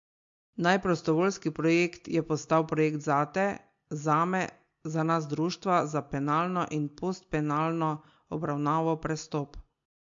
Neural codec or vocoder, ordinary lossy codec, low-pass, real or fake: none; MP3, 48 kbps; 7.2 kHz; real